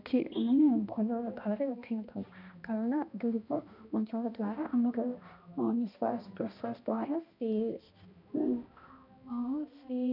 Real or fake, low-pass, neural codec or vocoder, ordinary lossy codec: fake; 5.4 kHz; codec, 16 kHz, 1 kbps, X-Codec, HuBERT features, trained on balanced general audio; none